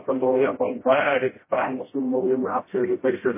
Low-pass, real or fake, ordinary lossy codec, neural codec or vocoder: 3.6 kHz; fake; MP3, 16 kbps; codec, 16 kHz, 0.5 kbps, FreqCodec, smaller model